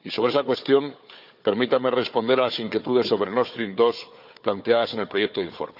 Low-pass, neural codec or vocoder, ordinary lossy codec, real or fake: 5.4 kHz; codec, 16 kHz, 4 kbps, FunCodec, trained on Chinese and English, 50 frames a second; none; fake